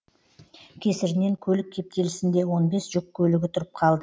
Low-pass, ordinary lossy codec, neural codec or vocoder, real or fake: none; none; none; real